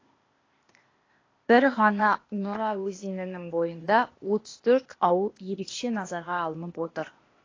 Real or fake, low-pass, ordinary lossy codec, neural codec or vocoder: fake; 7.2 kHz; AAC, 32 kbps; codec, 16 kHz, 0.8 kbps, ZipCodec